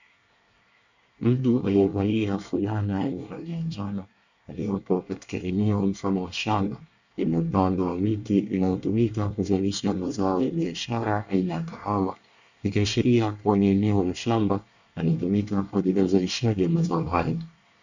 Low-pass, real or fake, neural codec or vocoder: 7.2 kHz; fake; codec, 24 kHz, 1 kbps, SNAC